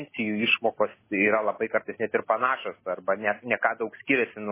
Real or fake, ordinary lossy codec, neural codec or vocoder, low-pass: real; MP3, 16 kbps; none; 3.6 kHz